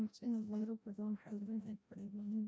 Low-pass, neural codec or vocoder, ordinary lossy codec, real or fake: none; codec, 16 kHz, 0.5 kbps, FreqCodec, larger model; none; fake